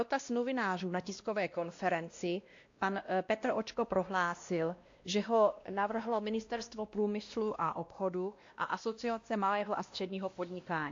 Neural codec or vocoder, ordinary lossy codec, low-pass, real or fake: codec, 16 kHz, 1 kbps, X-Codec, WavLM features, trained on Multilingual LibriSpeech; AAC, 48 kbps; 7.2 kHz; fake